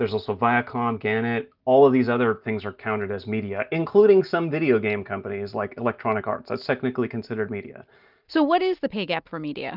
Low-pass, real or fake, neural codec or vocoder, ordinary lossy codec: 5.4 kHz; real; none; Opus, 24 kbps